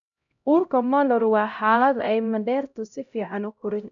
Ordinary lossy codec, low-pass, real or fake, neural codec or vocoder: none; 7.2 kHz; fake; codec, 16 kHz, 0.5 kbps, X-Codec, HuBERT features, trained on LibriSpeech